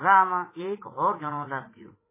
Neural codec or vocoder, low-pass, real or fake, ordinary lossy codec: vocoder, 44.1 kHz, 80 mel bands, Vocos; 3.6 kHz; fake; MP3, 16 kbps